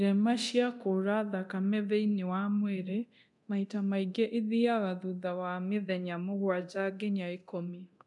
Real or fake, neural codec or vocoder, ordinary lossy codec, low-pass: fake; codec, 24 kHz, 0.9 kbps, DualCodec; none; 10.8 kHz